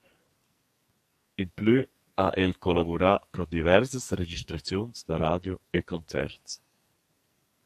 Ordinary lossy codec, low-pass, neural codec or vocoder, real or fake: AAC, 96 kbps; 14.4 kHz; codec, 44.1 kHz, 2.6 kbps, SNAC; fake